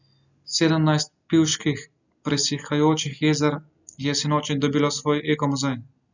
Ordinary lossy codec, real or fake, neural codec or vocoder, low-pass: none; real; none; 7.2 kHz